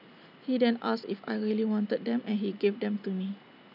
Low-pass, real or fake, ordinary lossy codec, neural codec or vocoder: 5.4 kHz; real; none; none